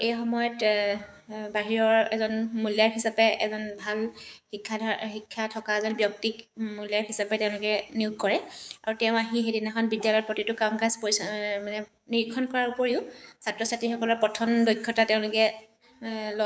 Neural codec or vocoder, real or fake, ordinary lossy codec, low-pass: codec, 16 kHz, 6 kbps, DAC; fake; none; none